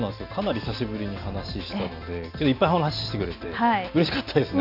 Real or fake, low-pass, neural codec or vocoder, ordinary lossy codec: real; 5.4 kHz; none; none